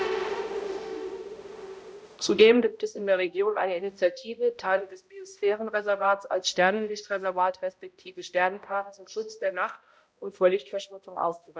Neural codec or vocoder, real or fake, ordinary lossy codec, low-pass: codec, 16 kHz, 0.5 kbps, X-Codec, HuBERT features, trained on balanced general audio; fake; none; none